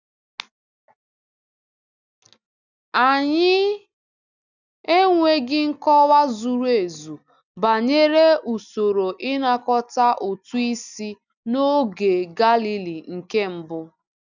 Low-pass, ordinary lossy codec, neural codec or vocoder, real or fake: 7.2 kHz; none; none; real